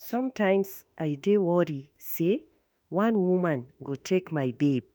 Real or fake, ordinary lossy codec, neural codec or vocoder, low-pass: fake; none; autoencoder, 48 kHz, 32 numbers a frame, DAC-VAE, trained on Japanese speech; none